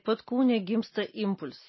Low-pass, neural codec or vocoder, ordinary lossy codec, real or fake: 7.2 kHz; none; MP3, 24 kbps; real